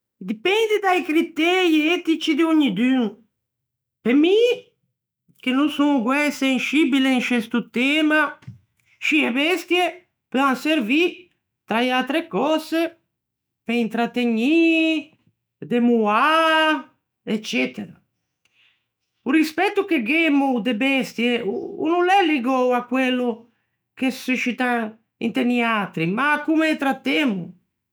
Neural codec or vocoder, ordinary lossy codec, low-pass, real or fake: autoencoder, 48 kHz, 128 numbers a frame, DAC-VAE, trained on Japanese speech; none; none; fake